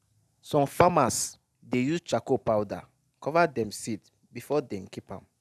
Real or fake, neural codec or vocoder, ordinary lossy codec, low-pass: real; none; none; 14.4 kHz